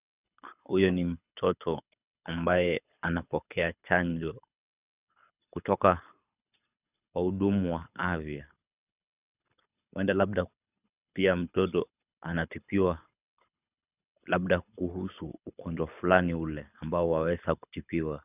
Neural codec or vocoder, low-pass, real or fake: codec, 24 kHz, 6 kbps, HILCodec; 3.6 kHz; fake